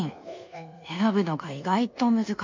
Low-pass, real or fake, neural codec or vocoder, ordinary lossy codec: 7.2 kHz; fake; codec, 24 kHz, 1.2 kbps, DualCodec; MP3, 48 kbps